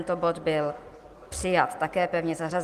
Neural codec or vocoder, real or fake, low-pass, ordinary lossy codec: none; real; 14.4 kHz; Opus, 24 kbps